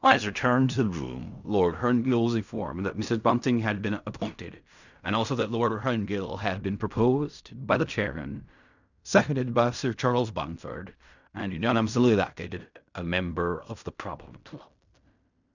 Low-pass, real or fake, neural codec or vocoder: 7.2 kHz; fake; codec, 16 kHz in and 24 kHz out, 0.4 kbps, LongCat-Audio-Codec, fine tuned four codebook decoder